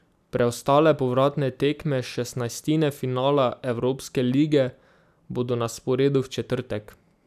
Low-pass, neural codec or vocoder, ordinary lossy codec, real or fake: 14.4 kHz; none; none; real